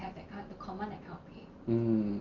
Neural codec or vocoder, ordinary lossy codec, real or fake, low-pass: none; Opus, 24 kbps; real; 7.2 kHz